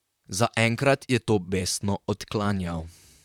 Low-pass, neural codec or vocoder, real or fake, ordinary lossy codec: 19.8 kHz; vocoder, 44.1 kHz, 128 mel bands, Pupu-Vocoder; fake; none